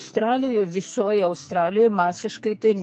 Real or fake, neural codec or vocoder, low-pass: fake; codec, 44.1 kHz, 2.6 kbps, SNAC; 10.8 kHz